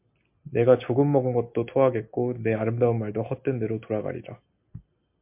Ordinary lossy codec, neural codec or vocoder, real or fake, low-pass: MP3, 32 kbps; none; real; 3.6 kHz